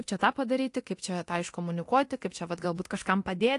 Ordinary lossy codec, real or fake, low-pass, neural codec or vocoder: AAC, 48 kbps; fake; 10.8 kHz; codec, 24 kHz, 0.9 kbps, DualCodec